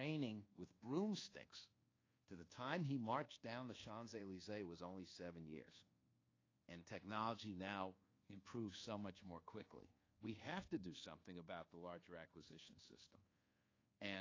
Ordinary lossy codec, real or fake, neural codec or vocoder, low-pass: AAC, 32 kbps; fake; codec, 24 kHz, 1.2 kbps, DualCodec; 7.2 kHz